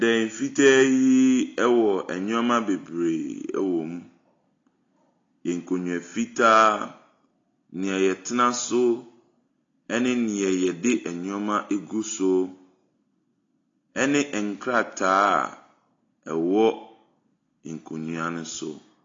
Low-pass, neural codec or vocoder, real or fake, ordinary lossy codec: 7.2 kHz; none; real; AAC, 32 kbps